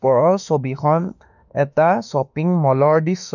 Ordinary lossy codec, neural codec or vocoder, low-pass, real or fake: none; autoencoder, 48 kHz, 32 numbers a frame, DAC-VAE, trained on Japanese speech; 7.2 kHz; fake